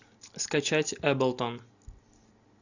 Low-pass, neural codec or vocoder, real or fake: 7.2 kHz; none; real